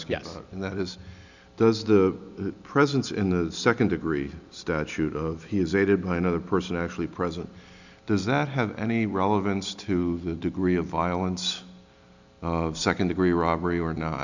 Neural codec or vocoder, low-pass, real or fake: none; 7.2 kHz; real